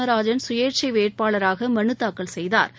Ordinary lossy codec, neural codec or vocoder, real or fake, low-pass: none; none; real; none